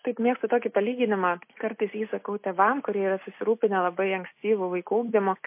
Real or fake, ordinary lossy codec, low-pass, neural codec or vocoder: real; MP3, 24 kbps; 3.6 kHz; none